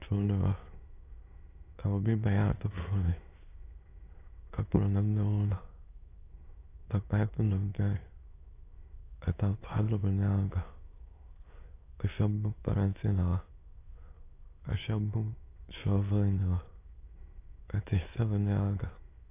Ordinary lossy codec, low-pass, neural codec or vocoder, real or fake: AAC, 24 kbps; 3.6 kHz; autoencoder, 22.05 kHz, a latent of 192 numbers a frame, VITS, trained on many speakers; fake